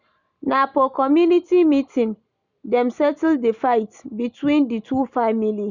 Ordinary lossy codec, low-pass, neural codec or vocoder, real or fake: none; 7.2 kHz; none; real